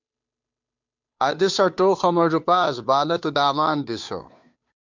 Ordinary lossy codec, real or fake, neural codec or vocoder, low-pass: MP3, 64 kbps; fake; codec, 16 kHz, 2 kbps, FunCodec, trained on Chinese and English, 25 frames a second; 7.2 kHz